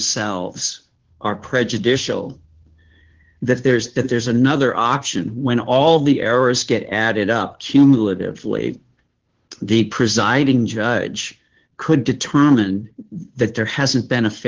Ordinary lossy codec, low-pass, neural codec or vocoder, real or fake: Opus, 16 kbps; 7.2 kHz; codec, 16 kHz, 2 kbps, FunCodec, trained on Chinese and English, 25 frames a second; fake